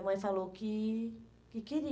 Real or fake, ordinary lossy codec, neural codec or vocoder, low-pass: real; none; none; none